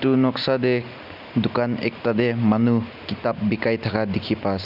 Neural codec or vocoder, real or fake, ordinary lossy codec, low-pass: none; real; none; 5.4 kHz